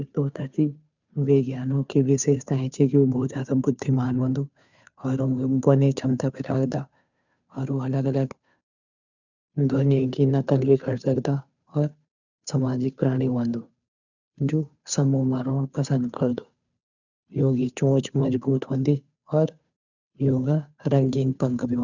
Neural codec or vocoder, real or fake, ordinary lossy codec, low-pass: codec, 16 kHz, 2 kbps, FunCodec, trained on Chinese and English, 25 frames a second; fake; none; 7.2 kHz